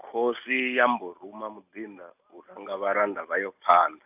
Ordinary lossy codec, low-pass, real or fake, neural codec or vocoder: AAC, 32 kbps; 3.6 kHz; real; none